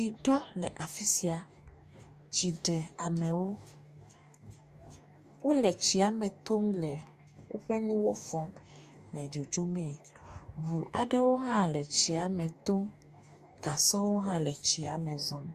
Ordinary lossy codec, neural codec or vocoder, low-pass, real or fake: Opus, 64 kbps; codec, 44.1 kHz, 2.6 kbps, DAC; 14.4 kHz; fake